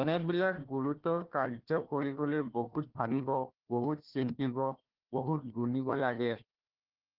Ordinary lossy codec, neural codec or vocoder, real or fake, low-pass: Opus, 16 kbps; codec, 16 kHz, 1 kbps, FunCodec, trained on Chinese and English, 50 frames a second; fake; 5.4 kHz